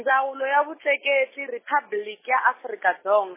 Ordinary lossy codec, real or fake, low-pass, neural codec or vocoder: MP3, 16 kbps; real; 3.6 kHz; none